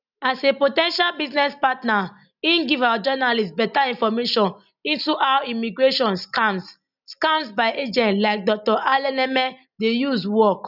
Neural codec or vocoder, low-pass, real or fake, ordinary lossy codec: none; 5.4 kHz; real; none